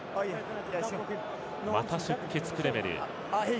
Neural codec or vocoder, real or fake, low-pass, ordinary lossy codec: none; real; none; none